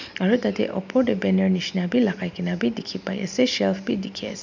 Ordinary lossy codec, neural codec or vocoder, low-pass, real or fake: none; none; 7.2 kHz; real